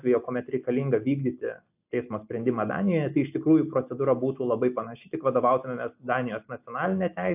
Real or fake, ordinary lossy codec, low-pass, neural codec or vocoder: real; AAC, 32 kbps; 3.6 kHz; none